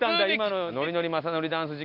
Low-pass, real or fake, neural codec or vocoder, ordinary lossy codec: 5.4 kHz; real; none; none